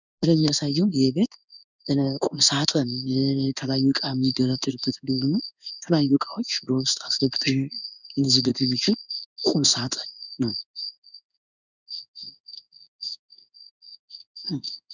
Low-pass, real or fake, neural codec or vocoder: 7.2 kHz; fake; codec, 16 kHz in and 24 kHz out, 1 kbps, XY-Tokenizer